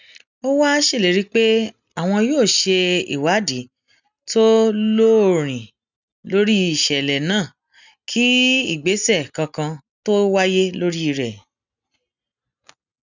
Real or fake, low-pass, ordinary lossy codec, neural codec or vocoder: real; 7.2 kHz; none; none